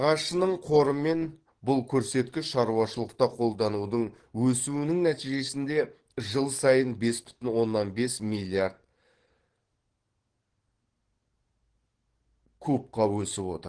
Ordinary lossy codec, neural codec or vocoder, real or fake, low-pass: Opus, 16 kbps; codec, 44.1 kHz, 7.8 kbps, DAC; fake; 9.9 kHz